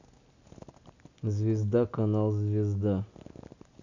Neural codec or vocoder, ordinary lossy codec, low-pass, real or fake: none; none; 7.2 kHz; real